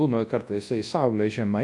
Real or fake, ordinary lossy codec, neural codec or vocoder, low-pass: fake; AAC, 48 kbps; codec, 24 kHz, 0.9 kbps, WavTokenizer, large speech release; 10.8 kHz